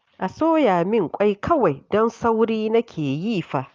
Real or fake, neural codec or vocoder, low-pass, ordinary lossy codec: real; none; 7.2 kHz; Opus, 32 kbps